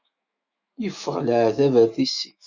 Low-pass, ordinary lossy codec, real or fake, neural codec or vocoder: 7.2 kHz; Opus, 64 kbps; fake; autoencoder, 48 kHz, 128 numbers a frame, DAC-VAE, trained on Japanese speech